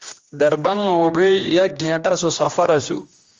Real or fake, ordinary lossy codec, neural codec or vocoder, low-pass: fake; Opus, 32 kbps; codec, 16 kHz, 1 kbps, X-Codec, HuBERT features, trained on general audio; 7.2 kHz